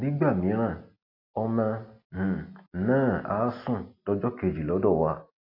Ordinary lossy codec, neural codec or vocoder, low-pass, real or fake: AAC, 32 kbps; none; 5.4 kHz; real